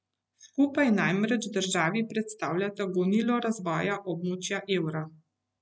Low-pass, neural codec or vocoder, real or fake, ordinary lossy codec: none; none; real; none